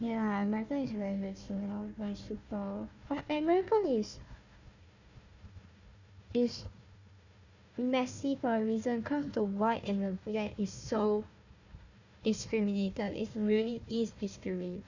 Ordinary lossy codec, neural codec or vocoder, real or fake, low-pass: none; codec, 16 kHz, 1 kbps, FunCodec, trained on Chinese and English, 50 frames a second; fake; 7.2 kHz